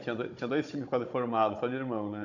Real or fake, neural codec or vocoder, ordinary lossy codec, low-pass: fake; codec, 16 kHz, 16 kbps, FunCodec, trained on Chinese and English, 50 frames a second; none; 7.2 kHz